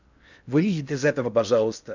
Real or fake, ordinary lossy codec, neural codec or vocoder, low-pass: fake; none; codec, 16 kHz in and 24 kHz out, 0.6 kbps, FocalCodec, streaming, 2048 codes; 7.2 kHz